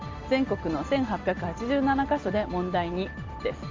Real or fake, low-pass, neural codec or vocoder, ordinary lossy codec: real; 7.2 kHz; none; Opus, 32 kbps